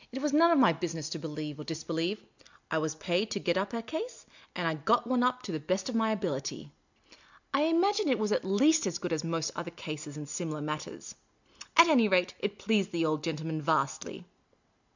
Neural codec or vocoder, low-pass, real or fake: none; 7.2 kHz; real